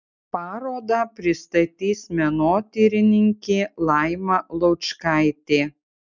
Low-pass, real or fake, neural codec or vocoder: 7.2 kHz; real; none